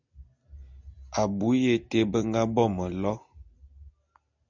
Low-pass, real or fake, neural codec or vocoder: 7.2 kHz; real; none